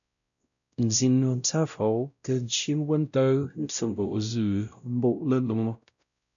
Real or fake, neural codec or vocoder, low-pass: fake; codec, 16 kHz, 0.5 kbps, X-Codec, WavLM features, trained on Multilingual LibriSpeech; 7.2 kHz